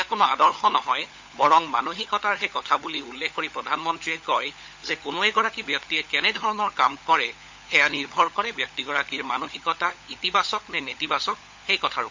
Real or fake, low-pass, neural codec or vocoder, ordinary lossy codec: fake; 7.2 kHz; codec, 16 kHz, 16 kbps, FunCodec, trained on LibriTTS, 50 frames a second; MP3, 48 kbps